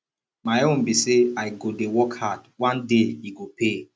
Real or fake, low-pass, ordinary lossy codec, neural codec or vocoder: real; none; none; none